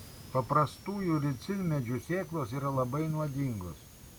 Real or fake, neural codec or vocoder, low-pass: real; none; 19.8 kHz